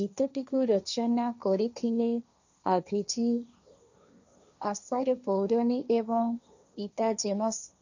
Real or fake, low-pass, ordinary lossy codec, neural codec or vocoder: fake; 7.2 kHz; none; codec, 16 kHz, 1.1 kbps, Voila-Tokenizer